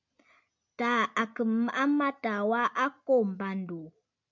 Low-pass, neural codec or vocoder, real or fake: 7.2 kHz; none; real